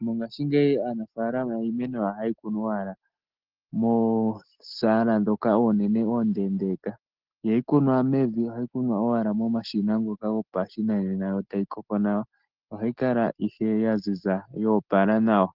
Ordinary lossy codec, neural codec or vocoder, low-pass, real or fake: Opus, 16 kbps; none; 5.4 kHz; real